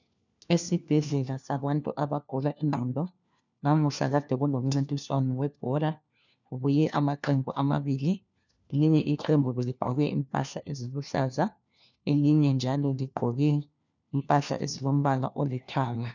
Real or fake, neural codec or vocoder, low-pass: fake; codec, 16 kHz, 1 kbps, FunCodec, trained on LibriTTS, 50 frames a second; 7.2 kHz